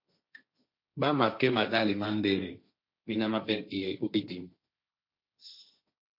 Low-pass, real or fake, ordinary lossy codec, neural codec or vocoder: 5.4 kHz; fake; MP3, 32 kbps; codec, 16 kHz, 1.1 kbps, Voila-Tokenizer